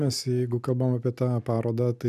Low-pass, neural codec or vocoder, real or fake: 14.4 kHz; none; real